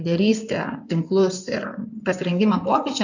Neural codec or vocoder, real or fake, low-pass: codec, 16 kHz in and 24 kHz out, 2.2 kbps, FireRedTTS-2 codec; fake; 7.2 kHz